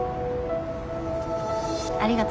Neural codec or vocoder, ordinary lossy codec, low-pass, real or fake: none; none; none; real